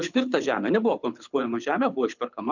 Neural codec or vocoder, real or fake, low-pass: codec, 24 kHz, 6 kbps, HILCodec; fake; 7.2 kHz